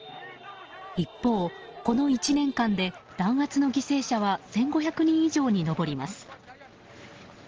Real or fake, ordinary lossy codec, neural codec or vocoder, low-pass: real; Opus, 16 kbps; none; 7.2 kHz